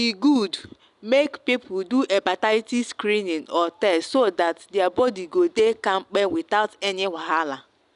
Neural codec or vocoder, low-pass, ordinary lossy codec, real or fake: none; 10.8 kHz; none; real